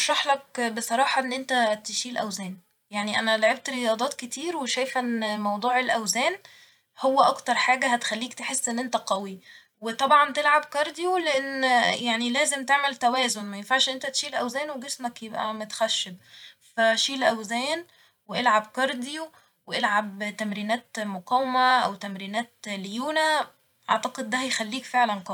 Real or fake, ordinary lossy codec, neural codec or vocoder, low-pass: fake; none; vocoder, 44.1 kHz, 128 mel bands every 512 samples, BigVGAN v2; 19.8 kHz